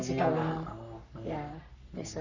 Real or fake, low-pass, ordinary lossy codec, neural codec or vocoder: fake; 7.2 kHz; none; codec, 44.1 kHz, 3.4 kbps, Pupu-Codec